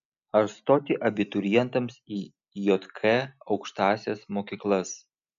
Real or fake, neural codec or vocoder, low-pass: real; none; 7.2 kHz